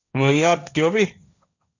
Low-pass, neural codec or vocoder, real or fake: 7.2 kHz; codec, 16 kHz, 1.1 kbps, Voila-Tokenizer; fake